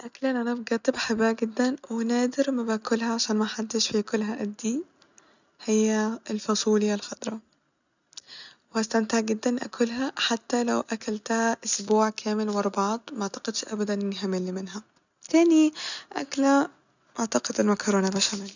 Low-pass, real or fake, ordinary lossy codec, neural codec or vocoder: 7.2 kHz; real; none; none